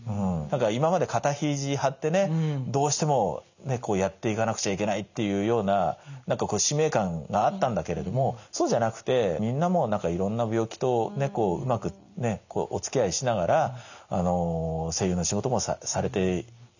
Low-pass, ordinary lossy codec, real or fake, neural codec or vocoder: 7.2 kHz; none; real; none